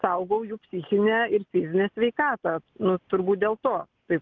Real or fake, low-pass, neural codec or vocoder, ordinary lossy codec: real; 7.2 kHz; none; Opus, 24 kbps